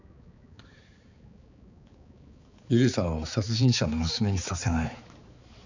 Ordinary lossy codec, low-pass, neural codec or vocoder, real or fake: none; 7.2 kHz; codec, 16 kHz, 4 kbps, X-Codec, HuBERT features, trained on balanced general audio; fake